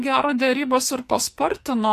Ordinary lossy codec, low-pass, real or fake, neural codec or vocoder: AAC, 64 kbps; 14.4 kHz; fake; codec, 44.1 kHz, 2.6 kbps, SNAC